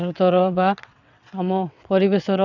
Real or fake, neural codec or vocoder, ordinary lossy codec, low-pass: fake; vocoder, 22.05 kHz, 80 mel bands, WaveNeXt; none; 7.2 kHz